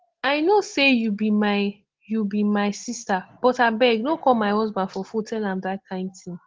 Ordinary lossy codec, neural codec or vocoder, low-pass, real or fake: Opus, 16 kbps; none; 7.2 kHz; real